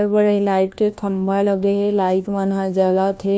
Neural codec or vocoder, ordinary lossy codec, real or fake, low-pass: codec, 16 kHz, 1 kbps, FunCodec, trained on LibriTTS, 50 frames a second; none; fake; none